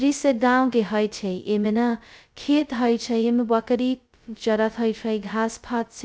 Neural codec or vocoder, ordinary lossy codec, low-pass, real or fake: codec, 16 kHz, 0.2 kbps, FocalCodec; none; none; fake